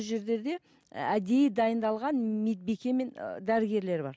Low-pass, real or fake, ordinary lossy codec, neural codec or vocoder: none; real; none; none